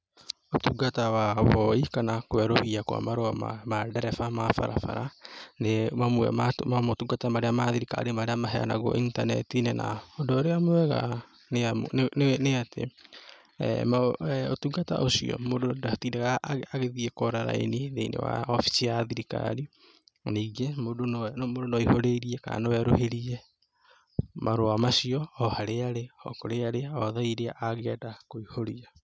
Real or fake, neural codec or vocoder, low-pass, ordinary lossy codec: real; none; none; none